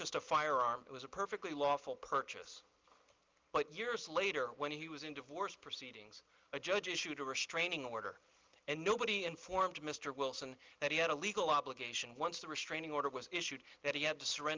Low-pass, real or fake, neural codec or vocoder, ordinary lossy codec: 7.2 kHz; real; none; Opus, 32 kbps